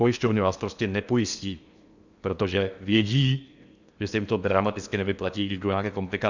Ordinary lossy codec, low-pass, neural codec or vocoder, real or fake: Opus, 64 kbps; 7.2 kHz; codec, 16 kHz in and 24 kHz out, 0.8 kbps, FocalCodec, streaming, 65536 codes; fake